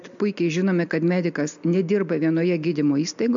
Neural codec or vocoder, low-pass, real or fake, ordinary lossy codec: none; 7.2 kHz; real; MP3, 64 kbps